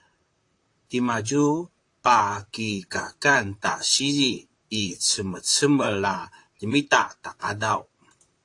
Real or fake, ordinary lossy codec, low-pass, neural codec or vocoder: fake; AAC, 48 kbps; 10.8 kHz; vocoder, 44.1 kHz, 128 mel bands, Pupu-Vocoder